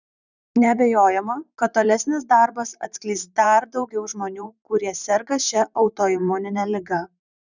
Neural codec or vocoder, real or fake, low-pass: vocoder, 44.1 kHz, 128 mel bands, Pupu-Vocoder; fake; 7.2 kHz